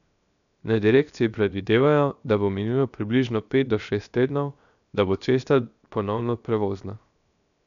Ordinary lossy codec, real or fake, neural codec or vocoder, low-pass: none; fake; codec, 16 kHz, 0.7 kbps, FocalCodec; 7.2 kHz